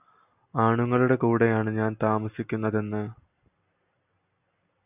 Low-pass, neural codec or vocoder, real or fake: 3.6 kHz; none; real